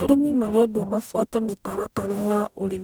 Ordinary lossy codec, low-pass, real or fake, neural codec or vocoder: none; none; fake; codec, 44.1 kHz, 0.9 kbps, DAC